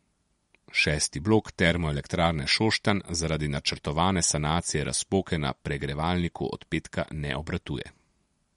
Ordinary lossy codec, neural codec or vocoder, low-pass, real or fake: MP3, 48 kbps; none; 19.8 kHz; real